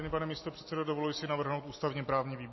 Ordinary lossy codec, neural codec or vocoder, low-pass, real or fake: MP3, 24 kbps; none; 7.2 kHz; real